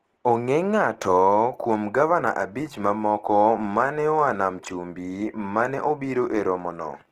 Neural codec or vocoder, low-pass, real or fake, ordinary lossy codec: none; 14.4 kHz; real; Opus, 16 kbps